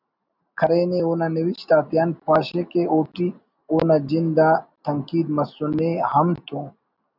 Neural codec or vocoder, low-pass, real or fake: none; 5.4 kHz; real